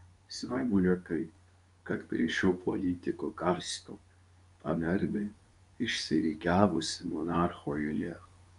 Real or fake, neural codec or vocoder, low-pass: fake; codec, 24 kHz, 0.9 kbps, WavTokenizer, medium speech release version 2; 10.8 kHz